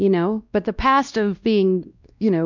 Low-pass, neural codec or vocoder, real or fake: 7.2 kHz; codec, 16 kHz, 1 kbps, X-Codec, WavLM features, trained on Multilingual LibriSpeech; fake